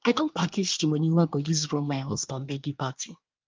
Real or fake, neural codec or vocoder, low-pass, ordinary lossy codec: fake; codec, 24 kHz, 1 kbps, SNAC; 7.2 kHz; Opus, 24 kbps